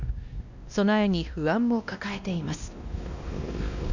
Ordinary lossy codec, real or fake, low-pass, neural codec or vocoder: none; fake; 7.2 kHz; codec, 16 kHz, 1 kbps, X-Codec, WavLM features, trained on Multilingual LibriSpeech